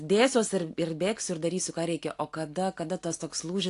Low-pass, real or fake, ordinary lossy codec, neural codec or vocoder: 10.8 kHz; real; AAC, 48 kbps; none